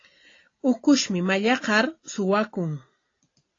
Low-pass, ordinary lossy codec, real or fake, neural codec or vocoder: 7.2 kHz; AAC, 32 kbps; real; none